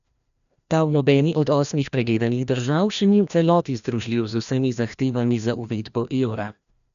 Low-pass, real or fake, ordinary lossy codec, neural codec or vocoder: 7.2 kHz; fake; none; codec, 16 kHz, 1 kbps, FreqCodec, larger model